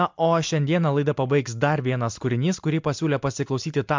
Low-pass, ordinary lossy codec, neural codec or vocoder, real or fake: 7.2 kHz; MP3, 64 kbps; none; real